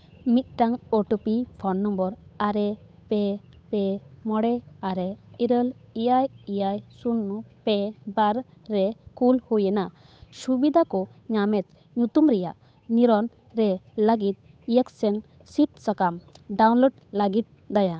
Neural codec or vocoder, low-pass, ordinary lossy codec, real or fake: codec, 16 kHz, 8 kbps, FunCodec, trained on Chinese and English, 25 frames a second; none; none; fake